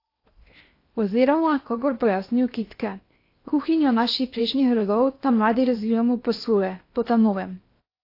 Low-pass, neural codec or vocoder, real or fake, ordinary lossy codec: 5.4 kHz; codec, 16 kHz in and 24 kHz out, 0.8 kbps, FocalCodec, streaming, 65536 codes; fake; AAC, 32 kbps